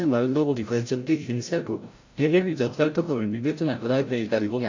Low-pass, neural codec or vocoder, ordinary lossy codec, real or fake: 7.2 kHz; codec, 16 kHz, 0.5 kbps, FreqCodec, larger model; AAC, 48 kbps; fake